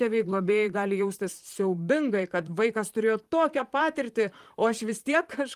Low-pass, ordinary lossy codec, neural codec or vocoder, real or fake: 14.4 kHz; Opus, 32 kbps; vocoder, 44.1 kHz, 128 mel bands, Pupu-Vocoder; fake